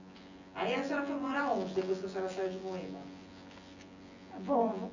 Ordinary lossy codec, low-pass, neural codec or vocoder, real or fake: Opus, 64 kbps; 7.2 kHz; vocoder, 24 kHz, 100 mel bands, Vocos; fake